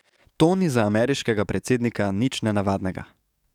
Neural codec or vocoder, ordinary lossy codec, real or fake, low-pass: codec, 44.1 kHz, 7.8 kbps, DAC; none; fake; 19.8 kHz